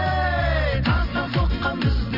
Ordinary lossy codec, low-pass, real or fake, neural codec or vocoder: none; 5.4 kHz; fake; vocoder, 44.1 kHz, 128 mel bands every 256 samples, BigVGAN v2